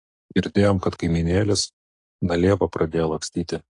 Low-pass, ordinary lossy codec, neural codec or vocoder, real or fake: 10.8 kHz; AAC, 48 kbps; none; real